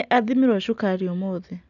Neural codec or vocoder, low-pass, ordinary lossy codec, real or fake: none; 7.2 kHz; none; real